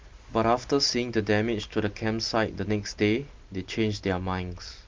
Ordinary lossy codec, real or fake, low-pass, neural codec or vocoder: Opus, 32 kbps; real; 7.2 kHz; none